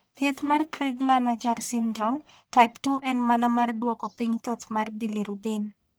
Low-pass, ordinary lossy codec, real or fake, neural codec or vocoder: none; none; fake; codec, 44.1 kHz, 1.7 kbps, Pupu-Codec